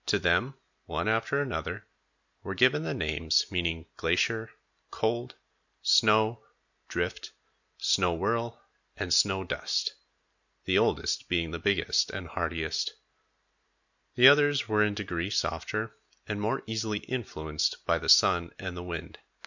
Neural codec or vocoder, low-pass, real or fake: none; 7.2 kHz; real